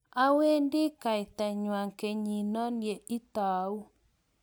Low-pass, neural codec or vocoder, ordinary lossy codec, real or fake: none; none; none; real